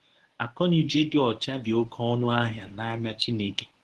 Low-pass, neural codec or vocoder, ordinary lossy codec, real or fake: 10.8 kHz; codec, 24 kHz, 0.9 kbps, WavTokenizer, medium speech release version 1; Opus, 16 kbps; fake